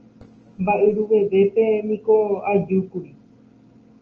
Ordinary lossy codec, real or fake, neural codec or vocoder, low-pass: Opus, 24 kbps; real; none; 7.2 kHz